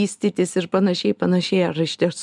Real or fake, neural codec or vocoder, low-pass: real; none; 10.8 kHz